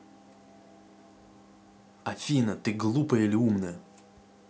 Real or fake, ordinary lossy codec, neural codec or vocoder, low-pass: real; none; none; none